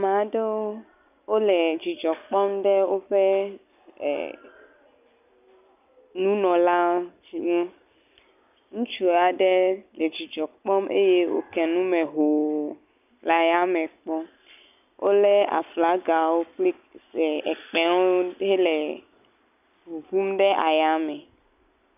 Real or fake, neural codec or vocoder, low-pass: real; none; 3.6 kHz